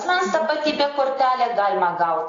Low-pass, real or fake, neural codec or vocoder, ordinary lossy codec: 7.2 kHz; real; none; AAC, 32 kbps